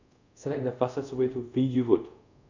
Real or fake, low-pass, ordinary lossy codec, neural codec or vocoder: fake; 7.2 kHz; none; codec, 24 kHz, 0.5 kbps, DualCodec